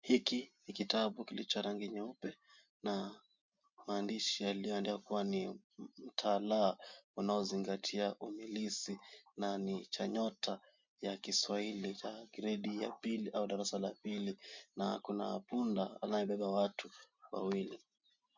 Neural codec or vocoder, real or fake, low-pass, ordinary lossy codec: none; real; 7.2 kHz; MP3, 64 kbps